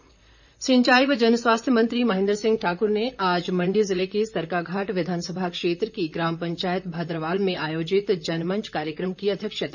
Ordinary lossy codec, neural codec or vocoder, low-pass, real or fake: none; vocoder, 44.1 kHz, 128 mel bands, Pupu-Vocoder; 7.2 kHz; fake